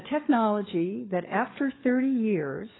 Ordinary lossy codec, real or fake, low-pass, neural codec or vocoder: AAC, 16 kbps; fake; 7.2 kHz; codec, 16 kHz, 4 kbps, FunCodec, trained on LibriTTS, 50 frames a second